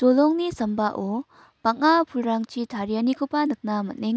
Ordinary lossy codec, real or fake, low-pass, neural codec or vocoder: none; real; none; none